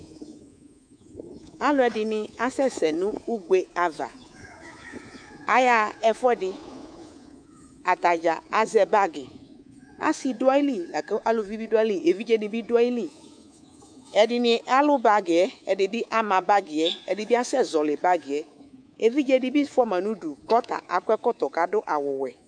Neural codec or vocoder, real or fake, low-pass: codec, 24 kHz, 3.1 kbps, DualCodec; fake; 9.9 kHz